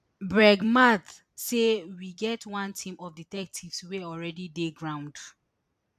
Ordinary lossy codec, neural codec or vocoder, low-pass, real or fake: none; vocoder, 44.1 kHz, 128 mel bands every 256 samples, BigVGAN v2; 14.4 kHz; fake